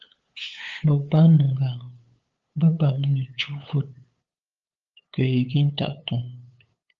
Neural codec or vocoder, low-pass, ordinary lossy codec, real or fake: codec, 16 kHz, 8 kbps, FunCodec, trained on LibriTTS, 25 frames a second; 7.2 kHz; Opus, 32 kbps; fake